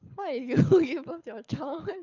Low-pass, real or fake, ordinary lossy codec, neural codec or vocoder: 7.2 kHz; fake; none; codec, 24 kHz, 6 kbps, HILCodec